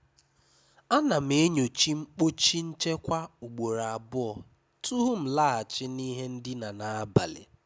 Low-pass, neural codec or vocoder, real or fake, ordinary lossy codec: none; none; real; none